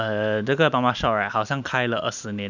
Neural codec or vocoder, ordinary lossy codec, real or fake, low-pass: none; none; real; 7.2 kHz